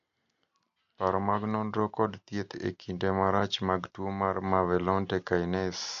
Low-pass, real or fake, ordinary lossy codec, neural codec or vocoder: 7.2 kHz; real; MP3, 48 kbps; none